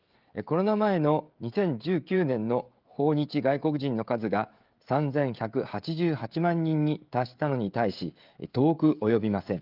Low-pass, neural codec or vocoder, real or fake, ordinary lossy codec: 5.4 kHz; codec, 16 kHz, 16 kbps, FreqCodec, smaller model; fake; Opus, 32 kbps